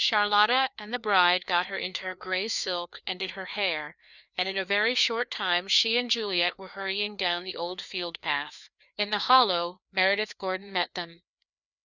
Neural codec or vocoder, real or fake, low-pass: codec, 16 kHz, 2 kbps, FreqCodec, larger model; fake; 7.2 kHz